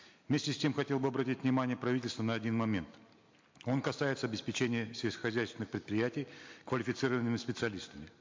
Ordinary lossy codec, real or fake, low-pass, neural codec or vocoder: MP3, 48 kbps; real; 7.2 kHz; none